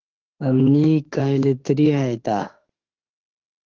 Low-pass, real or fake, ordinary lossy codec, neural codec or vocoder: 7.2 kHz; fake; Opus, 16 kbps; autoencoder, 48 kHz, 32 numbers a frame, DAC-VAE, trained on Japanese speech